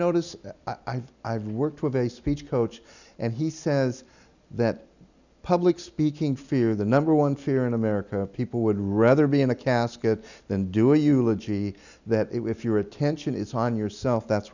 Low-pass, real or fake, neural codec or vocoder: 7.2 kHz; real; none